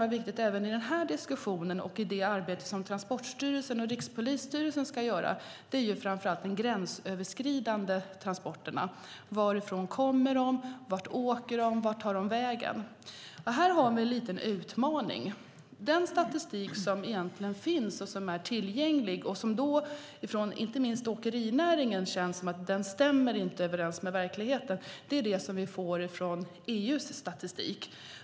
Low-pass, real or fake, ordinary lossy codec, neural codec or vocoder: none; real; none; none